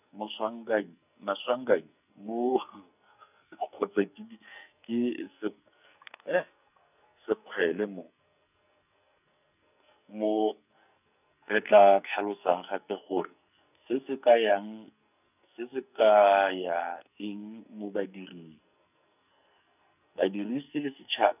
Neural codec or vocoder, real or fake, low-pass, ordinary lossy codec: codec, 44.1 kHz, 2.6 kbps, SNAC; fake; 3.6 kHz; none